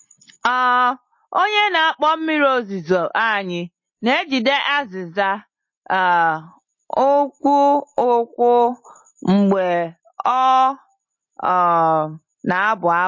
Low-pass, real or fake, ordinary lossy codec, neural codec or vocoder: 7.2 kHz; real; MP3, 32 kbps; none